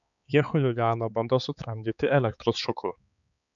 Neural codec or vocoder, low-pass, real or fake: codec, 16 kHz, 4 kbps, X-Codec, HuBERT features, trained on balanced general audio; 7.2 kHz; fake